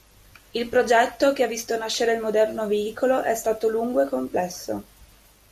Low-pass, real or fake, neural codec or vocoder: 14.4 kHz; real; none